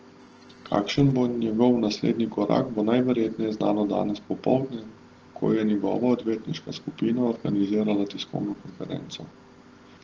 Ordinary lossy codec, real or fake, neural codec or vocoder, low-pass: Opus, 16 kbps; real; none; 7.2 kHz